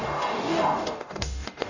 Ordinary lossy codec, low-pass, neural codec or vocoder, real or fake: none; 7.2 kHz; codec, 44.1 kHz, 0.9 kbps, DAC; fake